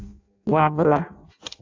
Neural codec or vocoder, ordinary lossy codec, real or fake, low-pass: codec, 16 kHz in and 24 kHz out, 0.6 kbps, FireRedTTS-2 codec; AAC, 48 kbps; fake; 7.2 kHz